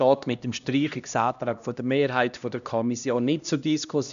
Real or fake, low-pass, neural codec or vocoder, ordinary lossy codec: fake; 7.2 kHz; codec, 16 kHz, 1 kbps, X-Codec, HuBERT features, trained on LibriSpeech; none